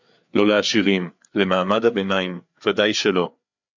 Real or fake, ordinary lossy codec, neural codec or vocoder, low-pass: fake; MP3, 64 kbps; codec, 16 kHz, 4 kbps, FreqCodec, larger model; 7.2 kHz